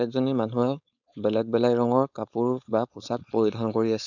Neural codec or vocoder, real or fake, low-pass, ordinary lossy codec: codec, 16 kHz, 4.8 kbps, FACodec; fake; 7.2 kHz; none